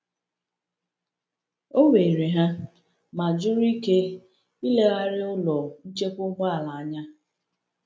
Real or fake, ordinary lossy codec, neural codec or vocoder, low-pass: real; none; none; none